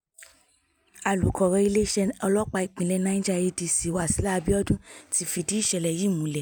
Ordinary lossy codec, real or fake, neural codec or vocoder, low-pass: none; real; none; 19.8 kHz